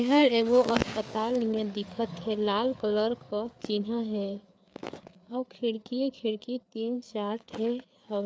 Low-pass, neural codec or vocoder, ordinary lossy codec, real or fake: none; codec, 16 kHz, 4 kbps, FreqCodec, larger model; none; fake